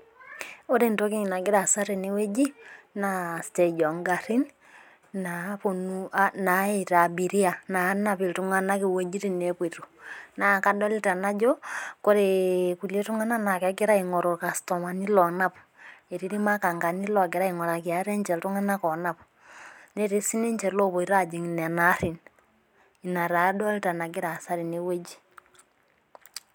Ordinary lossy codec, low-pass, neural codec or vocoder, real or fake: none; none; none; real